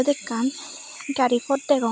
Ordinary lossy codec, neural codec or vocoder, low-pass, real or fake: none; none; none; real